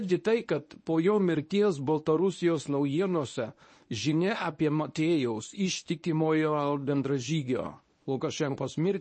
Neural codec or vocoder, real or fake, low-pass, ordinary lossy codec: codec, 24 kHz, 0.9 kbps, WavTokenizer, medium speech release version 1; fake; 9.9 kHz; MP3, 32 kbps